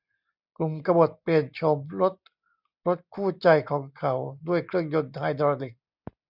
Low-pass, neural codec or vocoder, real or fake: 5.4 kHz; none; real